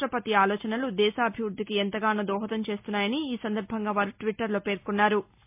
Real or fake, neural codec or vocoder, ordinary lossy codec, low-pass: real; none; MP3, 32 kbps; 3.6 kHz